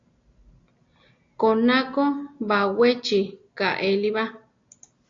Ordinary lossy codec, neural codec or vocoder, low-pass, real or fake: AAC, 48 kbps; none; 7.2 kHz; real